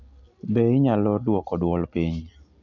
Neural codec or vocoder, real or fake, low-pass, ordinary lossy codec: none; real; 7.2 kHz; none